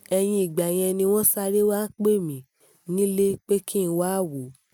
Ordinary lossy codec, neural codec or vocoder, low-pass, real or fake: none; none; none; real